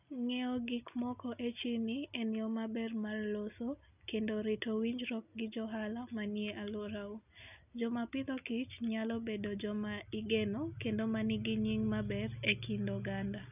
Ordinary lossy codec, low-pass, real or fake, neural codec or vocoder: none; 3.6 kHz; real; none